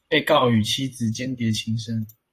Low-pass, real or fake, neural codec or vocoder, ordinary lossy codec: 14.4 kHz; fake; vocoder, 44.1 kHz, 128 mel bands, Pupu-Vocoder; AAC, 48 kbps